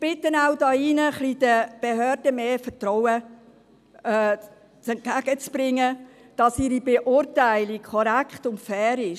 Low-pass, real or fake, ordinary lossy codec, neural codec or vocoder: 14.4 kHz; real; none; none